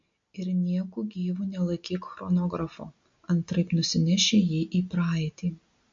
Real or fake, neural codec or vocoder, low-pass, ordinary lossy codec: real; none; 7.2 kHz; MP3, 48 kbps